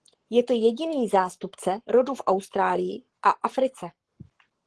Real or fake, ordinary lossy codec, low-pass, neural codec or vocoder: fake; Opus, 16 kbps; 10.8 kHz; codec, 44.1 kHz, 7.8 kbps, Pupu-Codec